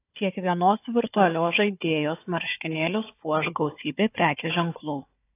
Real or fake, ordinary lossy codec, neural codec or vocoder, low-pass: fake; AAC, 24 kbps; codec, 16 kHz, 4 kbps, FunCodec, trained on Chinese and English, 50 frames a second; 3.6 kHz